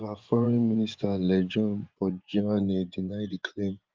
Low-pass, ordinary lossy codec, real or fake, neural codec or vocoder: 7.2 kHz; Opus, 24 kbps; fake; vocoder, 24 kHz, 100 mel bands, Vocos